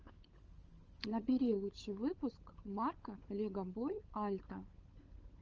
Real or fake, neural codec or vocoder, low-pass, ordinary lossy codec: fake; codec, 16 kHz, 16 kbps, FunCodec, trained on LibriTTS, 50 frames a second; 7.2 kHz; Opus, 24 kbps